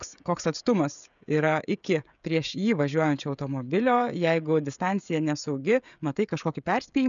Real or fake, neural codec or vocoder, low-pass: fake; codec, 16 kHz, 16 kbps, FreqCodec, smaller model; 7.2 kHz